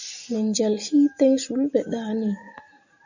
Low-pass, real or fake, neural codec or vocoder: 7.2 kHz; real; none